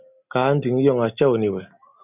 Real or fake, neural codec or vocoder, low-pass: real; none; 3.6 kHz